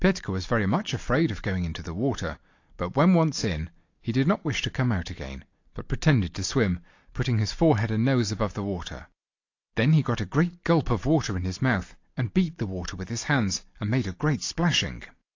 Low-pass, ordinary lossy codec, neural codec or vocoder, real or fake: 7.2 kHz; AAC, 48 kbps; none; real